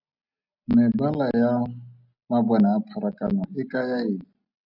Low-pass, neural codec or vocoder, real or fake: 5.4 kHz; none; real